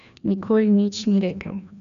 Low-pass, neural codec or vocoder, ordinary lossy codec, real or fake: 7.2 kHz; codec, 16 kHz, 1 kbps, FreqCodec, larger model; none; fake